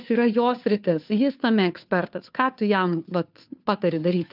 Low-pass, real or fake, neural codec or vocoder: 5.4 kHz; fake; codec, 16 kHz, 2 kbps, FunCodec, trained on Chinese and English, 25 frames a second